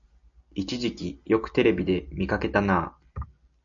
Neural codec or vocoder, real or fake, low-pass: none; real; 7.2 kHz